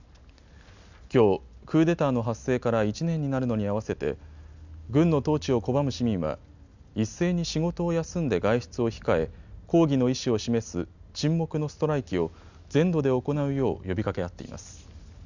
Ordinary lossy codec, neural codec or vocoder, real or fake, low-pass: none; none; real; 7.2 kHz